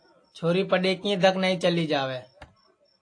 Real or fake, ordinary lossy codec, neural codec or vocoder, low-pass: real; AAC, 48 kbps; none; 9.9 kHz